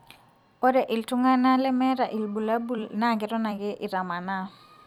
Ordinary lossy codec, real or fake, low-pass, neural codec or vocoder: none; fake; 19.8 kHz; vocoder, 44.1 kHz, 128 mel bands every 256 samples, BigVGAN v2